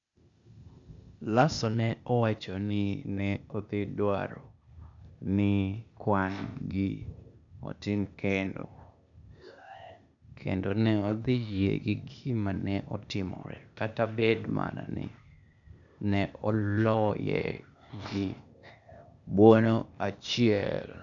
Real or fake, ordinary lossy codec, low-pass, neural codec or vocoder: fake; none; 7.2 kHz; codec, 16 kHz, 0.8 kbps, ZipCodec